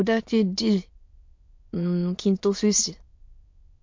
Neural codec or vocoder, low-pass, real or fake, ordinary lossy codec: autoencoder, 22.05 kHz, a latent of 192 numbers a frame, VITS, trained on many speakers; 7.2 kHz; fake; MP3, 48 kbps